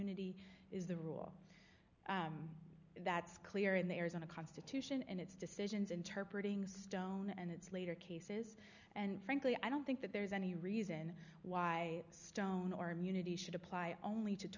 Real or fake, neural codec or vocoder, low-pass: real; none; 7.2 kHz